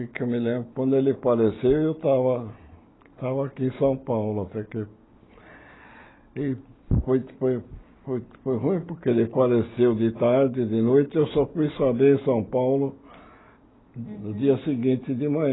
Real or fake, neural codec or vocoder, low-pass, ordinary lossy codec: real; none; 7.2 kHz; AAC, 16 kbps